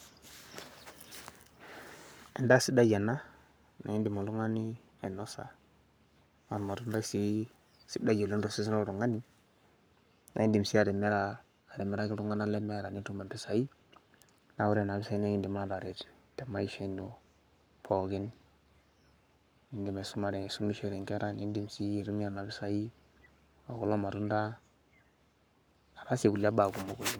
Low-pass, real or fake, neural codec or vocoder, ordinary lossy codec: none; fake; codec, 44.1 kHz, 7.8 kbps, Pupu-Codec; none